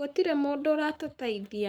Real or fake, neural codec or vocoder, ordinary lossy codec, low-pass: fake; codec, 44.1 kHz, 7.8 kbps, Pupu-Codec; none; none